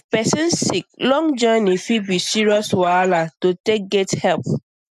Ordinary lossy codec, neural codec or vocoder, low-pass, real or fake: none; none; 14.4 kHz; real